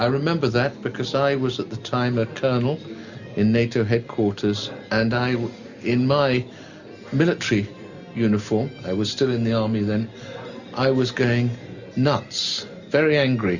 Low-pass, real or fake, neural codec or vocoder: 7.2 kHz; real; none